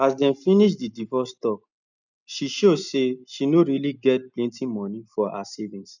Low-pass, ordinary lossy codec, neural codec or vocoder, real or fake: 7.2 kHz; none; none; real